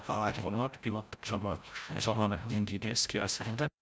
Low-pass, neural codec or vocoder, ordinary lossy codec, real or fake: none; codec, 16 kHz, 0.5 kbps, FreqCodec, larger model; none; fake